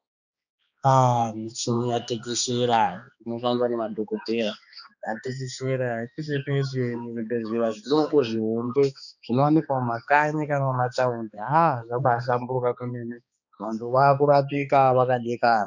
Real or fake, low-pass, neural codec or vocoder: fake; 7.2 kHz; codec, 16 kHz, 2 kbps, X-Codec, HuBERT features, trained on balanced general audio